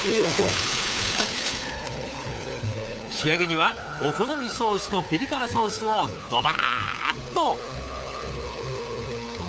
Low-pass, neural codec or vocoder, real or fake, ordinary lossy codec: none; codec, 16 kHz, 4 kbps, FunCodec, trained on LibriTTS, 50 frames a second; fake; none